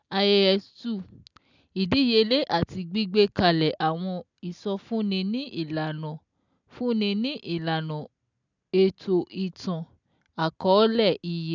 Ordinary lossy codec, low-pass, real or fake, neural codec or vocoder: none; 7.2 kHz; real; none